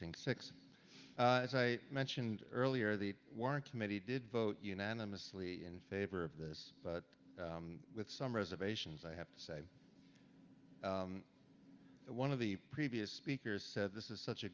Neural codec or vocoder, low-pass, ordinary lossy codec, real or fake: none; 7.2 kHz; Opus, 32 kbps; real